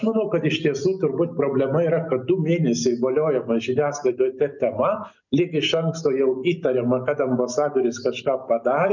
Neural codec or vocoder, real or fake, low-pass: none; real; 7.2 kHz